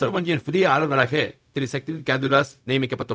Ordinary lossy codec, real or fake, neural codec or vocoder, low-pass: none; fake; codec, 16 kHz, 0.4 kbps, LongCat-Audio-Codec; none